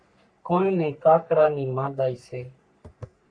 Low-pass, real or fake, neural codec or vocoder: 9.9 kHz; fake; codec, 44.1 kHz, 3.4 kbps, Pupu-Codec